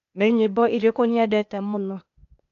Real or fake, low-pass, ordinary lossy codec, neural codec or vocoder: fake; 7.2 kHz; none; codec, 16 kHz, 0.8 kbps, ZipCodec